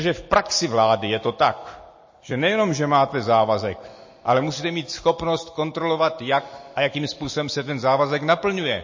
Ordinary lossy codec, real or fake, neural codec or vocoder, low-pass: MP3, 32 kbps; real; none; 7.2 kHz